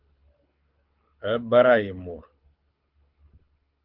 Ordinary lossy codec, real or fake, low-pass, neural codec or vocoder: Opus, 24 kbps; fake; 5.4 kHz; codec, 24 kHz, 6 kbps, HILCodec